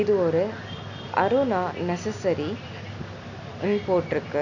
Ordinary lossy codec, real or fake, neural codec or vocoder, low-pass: none; real; none; 7.2 kHz